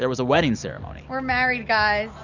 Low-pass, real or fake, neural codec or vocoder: 7.2 kHz; real; none